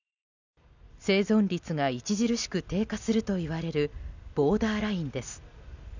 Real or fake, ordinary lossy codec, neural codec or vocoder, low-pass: real; none; none; 7.2 kHz